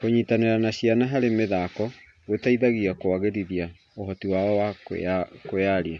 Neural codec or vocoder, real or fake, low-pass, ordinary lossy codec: none; real; none; none